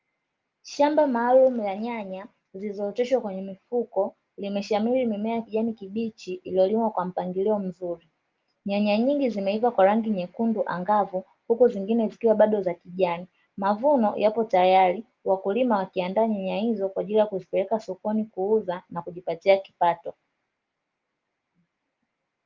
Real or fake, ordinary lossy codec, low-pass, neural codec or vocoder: real; Opus, 32 kbps; 7.2 kHz; none